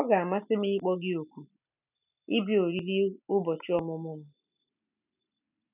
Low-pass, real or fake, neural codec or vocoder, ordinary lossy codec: 3.6 kHz; real; none; none